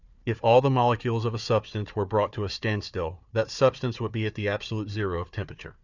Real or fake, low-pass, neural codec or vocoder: fake; 7.2 kHz; codec, 16 kHz, 4 kbps, FunCodec, trained on Chinese and English, 50 frames a second